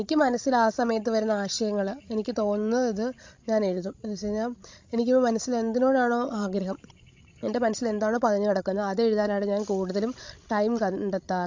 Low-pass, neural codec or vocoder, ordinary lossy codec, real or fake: 7.2 kHz; codec, 16 kHz, 16 kbps, FreqCodec, larger model; MP3, 48 kbps; fake